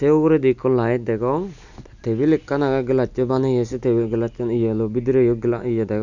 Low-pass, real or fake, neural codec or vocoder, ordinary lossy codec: 7.2 kHz; real; none; none